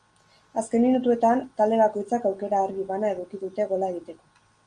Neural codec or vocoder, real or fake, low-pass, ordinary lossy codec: none; real; 9.9 kHz; Opus, 32 kbps